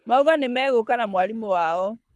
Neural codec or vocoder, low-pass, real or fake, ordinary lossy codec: codec, 24 kHz, 6 kbps, HILCodec; none; fake; none